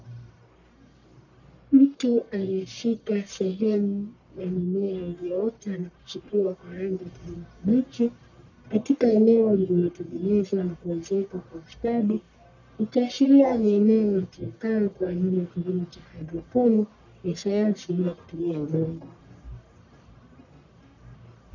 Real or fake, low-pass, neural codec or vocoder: fake; 7.2 kHz; codec, 44.1 kHz, 1.7 kbps, Pupu-Codec